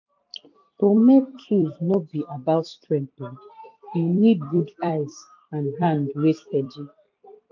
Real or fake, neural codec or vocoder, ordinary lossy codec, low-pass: fake; vocoder, 44.1 kHz, 128 mel bands every 256 samples, BigVGAN v2; none; 7.2 kHz